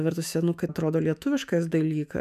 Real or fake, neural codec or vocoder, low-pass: fake; autoencoder, 48 kHz, 128 numbers a frame, DAC-VAE, trained on Japanese speech; 14.4 kHz